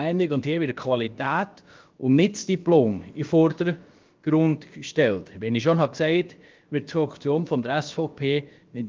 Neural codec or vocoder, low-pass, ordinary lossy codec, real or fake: codec, 16 kHz, about 1 kbps, DyCAST, with the encoder's durations; 7.2 kHz; Opus, 32 kbps; fake